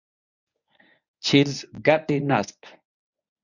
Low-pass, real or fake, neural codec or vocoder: 7.2 kHz; fake; codec, 24 kHz, 0.9 kbps, WavTokenizer, medium speech release version 1